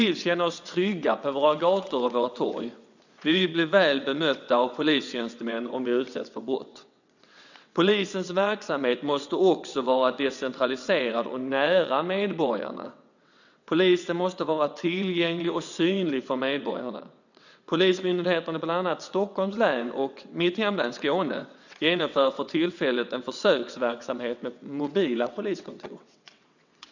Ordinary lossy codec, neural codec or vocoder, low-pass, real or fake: none; vocoder, 22.05 kHz, 80 mel bands, WaveNeXt; 7.2 kHz; fake